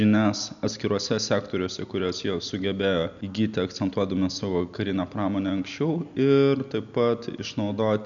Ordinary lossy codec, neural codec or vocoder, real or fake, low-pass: MP3, 96 kbps; none; real; 7.2 kHz